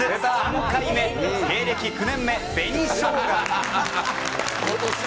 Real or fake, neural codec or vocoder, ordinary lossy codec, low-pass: real; none; none; none